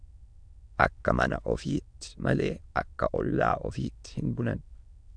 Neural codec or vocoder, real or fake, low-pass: autoencoder, 22.05 kHz, a latent of 192 numbers a frame, VITS, trained on many speakers; fake; 9.9 kHz